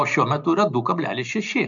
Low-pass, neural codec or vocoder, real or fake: 7.2 kHz; none; real